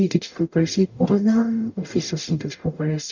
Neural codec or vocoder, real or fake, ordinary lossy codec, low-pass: codec, 44.1 kHz, 0.9 kbps, DAC; fake; none; 7.2 kHz